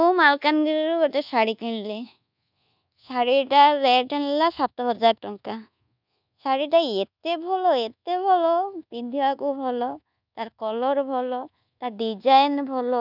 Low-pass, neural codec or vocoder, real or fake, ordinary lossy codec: 5.4 kHz; codec, 24 kHz, 1.2 kbps, DualCodec; fake; none